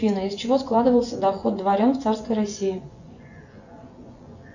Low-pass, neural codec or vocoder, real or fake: 7.2 kHz; vocoder, 24 kHz, 100 mel bands, Vocos; fake